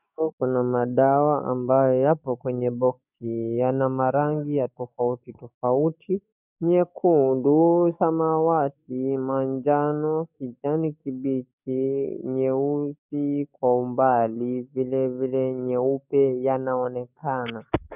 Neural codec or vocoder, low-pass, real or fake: codec, 44.1 kHz, 7.8 kbps, DAC; 3.6 kHz; fake